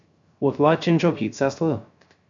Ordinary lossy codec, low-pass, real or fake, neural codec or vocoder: MP3, 64 kbps; 7.2 kHz; fake; codec, 16 kHz, 0.3 kbps, FocalCodec